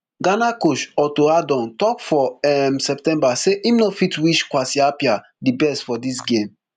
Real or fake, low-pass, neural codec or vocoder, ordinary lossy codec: real; 14.4 kHz; none; none